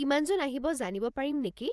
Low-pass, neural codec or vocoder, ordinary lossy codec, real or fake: none; vocoder, 24 kHz, 100 mel bands, Vocos; none; fake